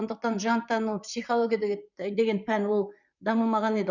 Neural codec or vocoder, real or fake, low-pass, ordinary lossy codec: vocoder, 44.1 kHz, 128 mel bands, Pupu-Vocoder; fake; 7.2 kHz; none